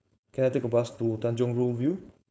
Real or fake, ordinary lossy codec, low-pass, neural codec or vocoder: fake; none; none; codec, 16 kHz, 4.8 kbps, FACodec